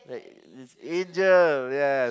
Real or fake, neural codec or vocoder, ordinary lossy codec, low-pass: real; none; none; none